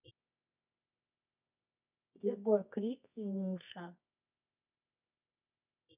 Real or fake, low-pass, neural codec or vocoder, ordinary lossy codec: fake; 3.6 kHz; codec, 24 kHz, 0.9 kbps, WavTokenizer, medium music audio release; none